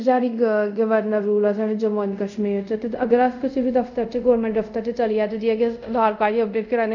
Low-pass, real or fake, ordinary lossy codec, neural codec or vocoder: 7.2 kHz; fake; none; codec, 24 kHz, 0.5 kbps, DualCodec